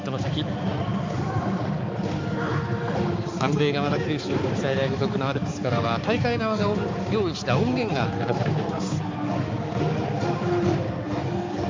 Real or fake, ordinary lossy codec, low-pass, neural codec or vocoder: fake; MP3, 64 kbps; 7.2 kHz; codec, 16 kHz, 4 kbps, X-Codec, HuBERT features, trained on balanced general audio